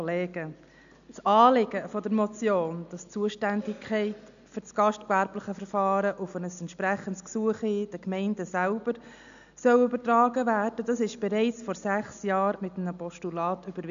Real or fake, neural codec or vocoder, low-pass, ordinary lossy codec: real; none; 7.2 kHz; none